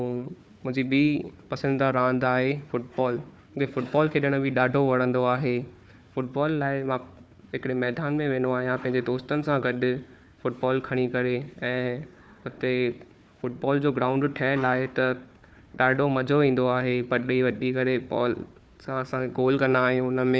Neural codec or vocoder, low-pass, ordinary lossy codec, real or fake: codec, 16 kHz, 4 kbps, FunCodec, trained on Chinese and English, 50 frames a second; none; none; fake